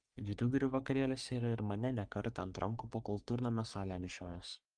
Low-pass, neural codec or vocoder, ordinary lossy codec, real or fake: 9.9 kHz; codec, 44.1 kHz, 3.4 kbps, Pupu-Codec; Opus, 32 kbps; fake